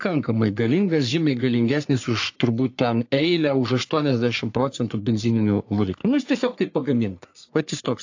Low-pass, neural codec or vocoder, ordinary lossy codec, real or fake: 7.2 kHz; codec, 44.1 kHz, 3.4 kbps, Pupu-Codec; AAC, 48 kbps; fake